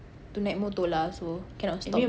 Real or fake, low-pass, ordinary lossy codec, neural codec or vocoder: real; none; none; none